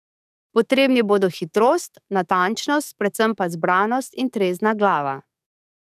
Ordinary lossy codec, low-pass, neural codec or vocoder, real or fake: none; 14.4 kHz; codec, 44.1 kHz, 7.8 kbps, DAC; fake